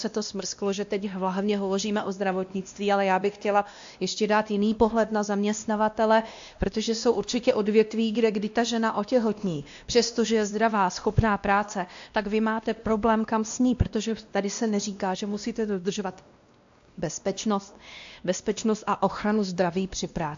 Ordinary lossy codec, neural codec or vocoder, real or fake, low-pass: AAC, 64 kbps; codec, 16 kHz, 1 kbps, X-Codec, WavLM features, trained on Multilingual LibriSpeech; fake; 7.2 kHz